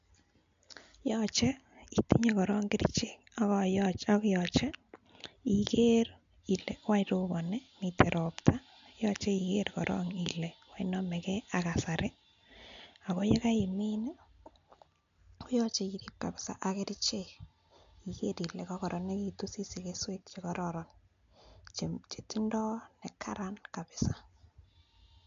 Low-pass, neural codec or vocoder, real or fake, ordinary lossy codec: 7.2 kHz; none; real; MP3, 64 kbps